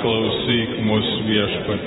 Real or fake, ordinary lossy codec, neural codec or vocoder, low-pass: fake; AAC, 16 kbps; vocoder, 48 kHz, 128 mel bands, Vocos; 19.8 kHz